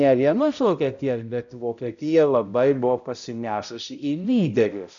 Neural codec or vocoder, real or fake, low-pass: codec, 16 kHz, 0.5 kbps, X-Codec, HuBERT features, trained on balanced general audio; fake; 7.2 kHz